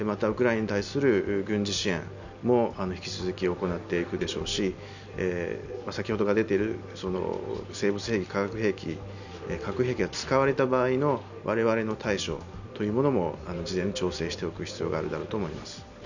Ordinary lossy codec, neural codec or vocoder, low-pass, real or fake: none; none; 7.2 kHz; real